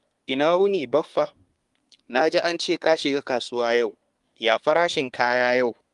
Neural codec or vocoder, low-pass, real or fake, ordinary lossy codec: codec, 24 kHz, 1 kbps, SNAC; 10.8 kHz; fake; Opus, 32 kbps